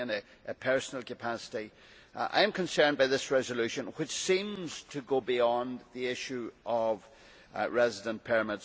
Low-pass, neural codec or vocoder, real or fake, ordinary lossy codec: none; none; real; none